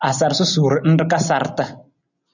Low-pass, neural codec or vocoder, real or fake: 7.2 kHz; none; real